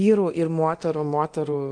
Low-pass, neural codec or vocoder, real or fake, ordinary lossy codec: 9.9 kHz; codec, 24 kHz, 0.9 kbps, DualCodec; fake; Opus, 24 kbps